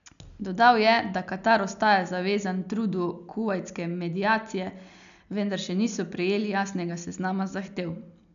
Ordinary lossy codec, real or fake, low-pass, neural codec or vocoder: none; real; 7.2 kHz; none